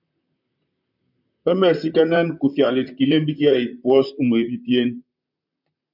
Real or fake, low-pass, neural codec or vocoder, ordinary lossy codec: fake; 5.4 kHz; vocoder, 44.1 kHz, 128 mel bands, Pupu-Vocoder; MP3, 48 kbps